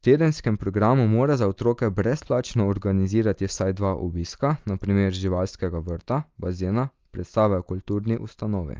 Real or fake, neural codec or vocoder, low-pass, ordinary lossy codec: real; none; 7.2 kHz; Opus, 24 kbps